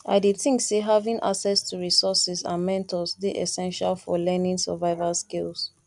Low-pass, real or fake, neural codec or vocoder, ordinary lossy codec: 10.8 kHz; real; none; none